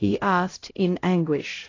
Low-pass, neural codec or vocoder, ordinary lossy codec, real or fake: 7.2 kHz; codec, 16 kHz, 0.5 kbps, X-Codec, HuBERT features, trained on LibriSpeech; AAC, 32 kbps; fake